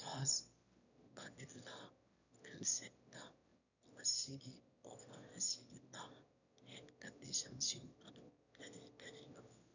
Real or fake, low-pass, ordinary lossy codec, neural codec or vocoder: fake; 7.2 kHz; none; autoencoder, 22.05 kHz, a latent of 192 numbers a frame, VITS, trained on one speaker